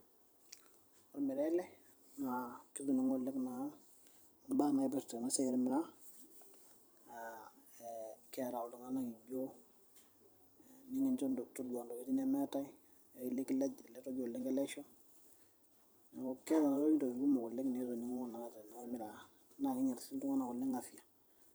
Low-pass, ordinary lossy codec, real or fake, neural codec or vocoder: none; none; fake; vocoder, 44.1 kHz, 128 mel bands every 512 samples, BigVGAN v2